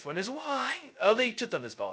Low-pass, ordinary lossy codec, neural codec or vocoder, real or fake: none; none; codec, 16 kHz, 0.2 kbps, FocalCodec; fake